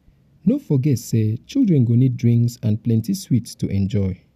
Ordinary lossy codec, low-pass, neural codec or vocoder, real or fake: none; 14.4 kHz; none; real